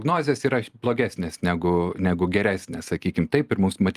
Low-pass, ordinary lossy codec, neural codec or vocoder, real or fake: 14.4 kHz; Opus, 32 kbps; none; real